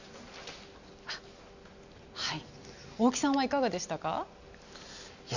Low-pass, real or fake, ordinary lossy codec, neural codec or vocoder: 7.2 kHz; real; none; none